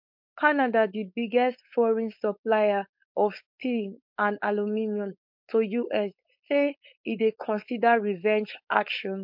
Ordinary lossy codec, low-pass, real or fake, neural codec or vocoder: MP3, 48 kbps; 5.4 kHz; fake; codec, 16 kHz, 4.8 kbps, FACodec